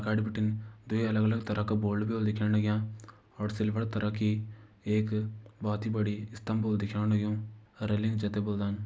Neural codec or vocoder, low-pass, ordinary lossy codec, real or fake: none; none; none; real